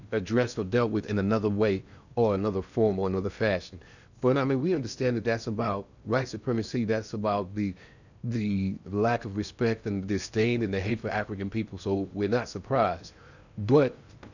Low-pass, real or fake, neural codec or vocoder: 7.2 kHz; fake; codec, 16 kHz in and 24 kHz out, 0.8 kbps, FocalCodec, streaming, 65536 codes